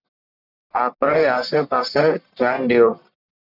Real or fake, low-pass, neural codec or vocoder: fake; 5.4 kHz; codec, 44.1 kHz, 1.7 kbps, Pupu-Codec